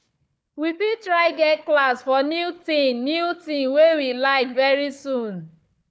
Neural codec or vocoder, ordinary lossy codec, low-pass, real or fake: codec, 16 kHz, 4 kbps, FunCodec, trained on Chinese and English, 50 frames a second; none; none; fake